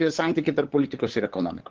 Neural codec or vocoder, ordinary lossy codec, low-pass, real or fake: codec, 16 kHz, 8 kbps, FunCodec, trained on LibriTTS, 25 frames a second; Opus, 16 kbps; 7.2 kHz; fake